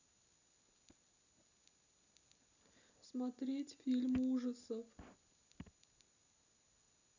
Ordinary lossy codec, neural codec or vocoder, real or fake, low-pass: none; none; real; 7.2 kHz